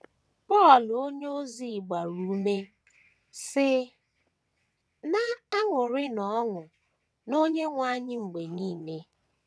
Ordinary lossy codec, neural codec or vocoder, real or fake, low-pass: none; vocoder, 22.05 kHz, 80 mel bands, WaveNeXt; fake; none